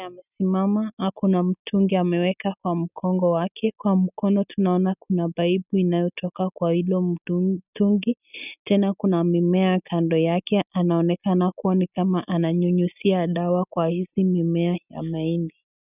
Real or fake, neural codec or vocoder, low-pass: real; none; 3.6 kHz